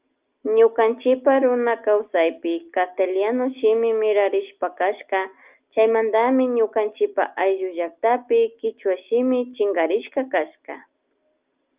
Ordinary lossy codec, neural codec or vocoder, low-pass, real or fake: Opus, 32 kbps; none; 3.6 kHz; real